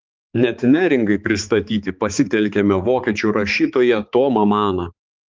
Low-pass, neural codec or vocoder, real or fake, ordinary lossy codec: 7.2 kHz; codec, 16 kHz, 4 kbps, X-Codec, HuBERT features, trained on balanced general audio; fake; Opus, 24 kbps